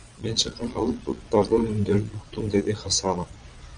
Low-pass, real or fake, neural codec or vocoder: 9.9 kHz; fake; vocoder, 22.05 kHz, 80 mel bands, Vocos